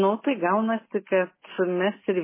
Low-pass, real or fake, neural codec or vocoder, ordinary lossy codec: 3.6 kHz; real; none; MP3, 16 kbps